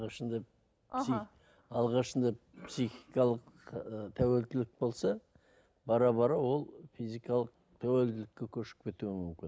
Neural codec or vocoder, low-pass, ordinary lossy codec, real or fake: none; none; none; real